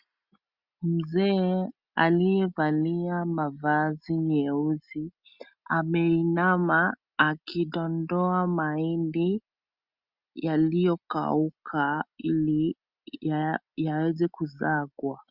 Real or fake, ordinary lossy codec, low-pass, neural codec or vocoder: real; Opus, 64 kbps; 5.4 kHz; none